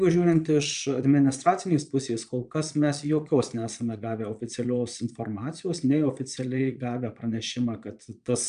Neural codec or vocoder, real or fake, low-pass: vocoder, 22.05 kHz, 80 mel bands, Vocos; fake; 9.9 kHz